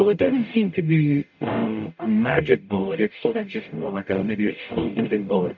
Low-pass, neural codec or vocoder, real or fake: 7.2 kHz; codec, 44.1 kHz, 0.9 kbps, DAC; fake